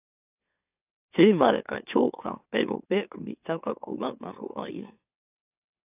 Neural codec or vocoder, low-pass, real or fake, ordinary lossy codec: autoencoder, 44.1 kHz, a latent of 192 numbers a frame, MeloTTS; 3.6 kHz; fake; AAC, 32 kbps